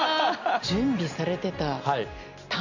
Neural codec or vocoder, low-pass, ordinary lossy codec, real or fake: none; 7.2 kHz; none; real